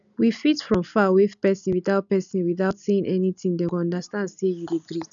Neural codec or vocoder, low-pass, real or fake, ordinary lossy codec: none; 7.2 kHz; real; none